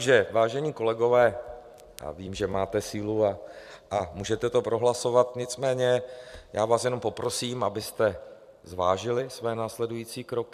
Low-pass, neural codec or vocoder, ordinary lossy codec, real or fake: 14.4 kHz; vocoder, 44.1 kHz, 128 mel bands every 256 samples, BigVGAN v2; MP3, 96 kbps; fake